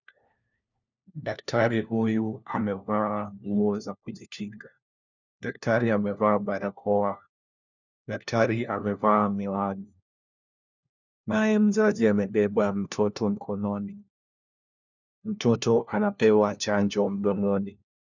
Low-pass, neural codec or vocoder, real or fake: 7.2 kHz; codec, 16 kHz, 1 kbps, FunCodec, trained on LibriTTS, 50 frames a second; fake